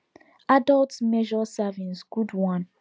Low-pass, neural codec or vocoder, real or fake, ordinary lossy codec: none; none; real; none